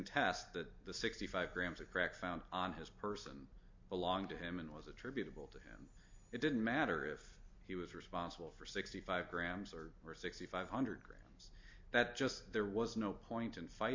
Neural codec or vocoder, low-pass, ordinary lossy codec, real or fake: none; 7.2 kHz; MP3, 48 kbps; real